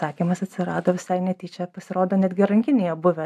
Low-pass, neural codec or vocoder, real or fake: 14.4 kHz; none; real